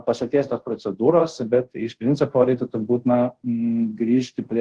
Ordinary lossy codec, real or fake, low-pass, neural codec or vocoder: Opus, 16 kbps; fake; 10.8 kHz; codec, 24 kHz, 0.5 kbps, DualCodec